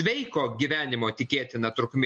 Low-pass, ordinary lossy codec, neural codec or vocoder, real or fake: 10.8 kHz; MP3, 48 kbps; none; real